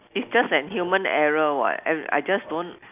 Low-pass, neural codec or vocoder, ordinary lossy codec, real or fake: 3.6 kHz; none; none; real